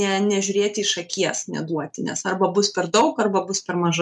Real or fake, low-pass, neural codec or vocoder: real; 10.8 kHz; none